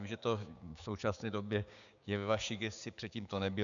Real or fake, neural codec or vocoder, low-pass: fake; codec, 16 kHz, 6 kbps, DAC; 7.2 kHz